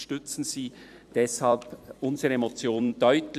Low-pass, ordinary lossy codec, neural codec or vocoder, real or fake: 14.4 kHz; none; vocoder, 44.1 kHz, 128 mel bands every 256 samples, BigVGAN v2; fake